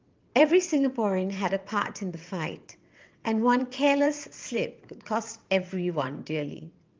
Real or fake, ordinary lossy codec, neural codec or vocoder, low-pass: fake; Opus, 24 kbps; vocoder, 22.05 kHz, 80 mel bands, WaveNeXt; 7.2 kHz